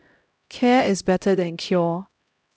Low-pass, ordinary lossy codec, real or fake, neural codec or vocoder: none; none; fake; codec, 16 kHz, 0.5 kbps, X-Codec, HuBERT features, trained on LibriSpeech